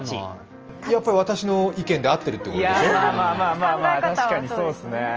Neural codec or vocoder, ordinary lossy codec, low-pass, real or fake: none; Opus, 24 kbps; 7.2 kHz; real